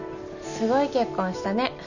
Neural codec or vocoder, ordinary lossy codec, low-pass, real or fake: none; none; 7.2 kHz; real